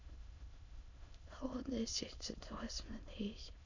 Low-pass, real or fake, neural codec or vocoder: 7.2 kHz; fake; autoencoder, 22.05 kHz, a latent of 192 numbers a frame, VITS, trained on many speakers